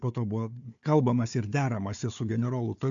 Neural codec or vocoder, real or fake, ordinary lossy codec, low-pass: codec, 16 kHz, 4 kbps, FunCodec, trained on Chinese and English, 50 frames a second; fake; AAC, 64 kbps; 7.2 kHz